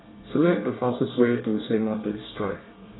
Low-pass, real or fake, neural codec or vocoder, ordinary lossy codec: 7.2 kHz; fake; codec, 24 kHz, 1 kbps, SNAC; AAC, 16 kbps